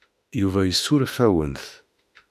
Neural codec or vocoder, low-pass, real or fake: autoencoder, 48 kHz, 32 numbers a frame, DAC-VAE, trained on Japanese speech; 14.4 kHz; fake